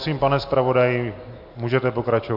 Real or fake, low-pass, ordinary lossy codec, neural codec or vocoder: real; 5.4 kHz; MP3, 48 kbps; none